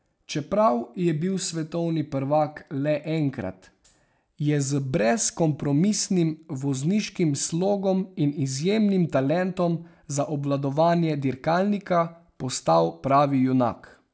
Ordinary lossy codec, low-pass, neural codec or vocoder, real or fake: none; none; none; real